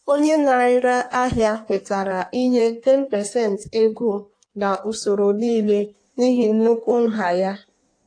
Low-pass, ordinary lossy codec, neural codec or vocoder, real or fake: 9.9 kHz; AAC, 48 kbps; codec, 16 kHz in and 24 kHz out, 1.1 kbps, FireRedTTS-2 codec; fake